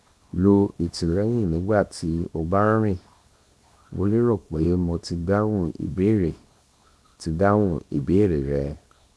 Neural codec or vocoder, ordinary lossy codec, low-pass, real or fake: codec, 24 kHz, 0.9 kbps, WavTokenizer, small release; none; none; fake